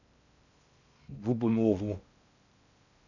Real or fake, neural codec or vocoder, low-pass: fake; codec, 16 kHz in and 24 kHz out, 0.6 kbps, FocalCodec, streaming, 2048 codes; 7.2 kHz